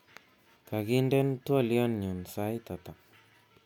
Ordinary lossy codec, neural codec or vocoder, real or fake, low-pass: none; none; real; 19.8 kHz